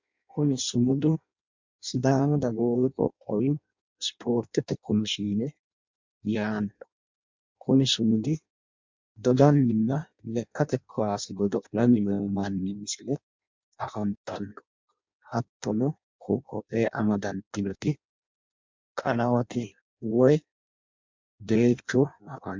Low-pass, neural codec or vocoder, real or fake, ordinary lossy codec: 7.2 kHz; codec, 16 kHz in and 24 kHz out, 0.6 kbps, FireRedTTS-2 codec; fake; MP3, 64 kbps